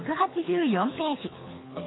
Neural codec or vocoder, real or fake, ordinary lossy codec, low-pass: codec, 24 kHz, 3 kbps, HILCodec; fake; AAC, 16 kbps; 7.2 kHz